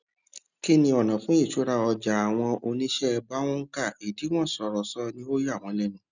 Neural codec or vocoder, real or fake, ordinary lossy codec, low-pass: none; real; none; 7.2 kHz